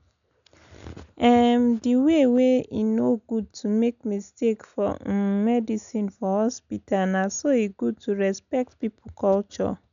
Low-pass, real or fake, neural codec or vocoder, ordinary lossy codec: 7.2 kHz; real; none; none